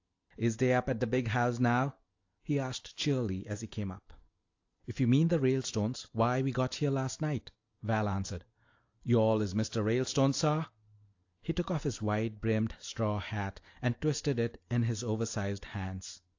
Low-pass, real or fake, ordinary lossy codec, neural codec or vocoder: 7.2 kHz; real; AAC, 48 kbps; none